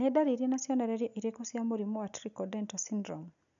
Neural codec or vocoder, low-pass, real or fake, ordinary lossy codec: none; 7.2 kHz; real; none